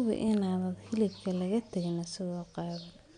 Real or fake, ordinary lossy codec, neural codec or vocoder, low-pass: real; none; none; 9.9 kHz